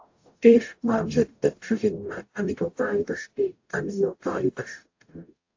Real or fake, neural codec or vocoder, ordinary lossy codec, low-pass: fake; codec, 44.1 kHz, 0.9 kbps, DAC; AAC, 48 kbps; 7.2 kHz